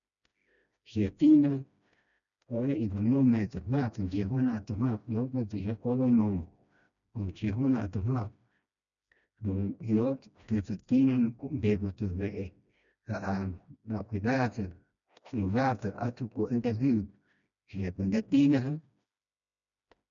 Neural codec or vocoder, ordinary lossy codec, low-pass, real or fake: codec, 16 kHz, 1 kbps, FreqCodec, smaller model; none; 7.2 kHz; fake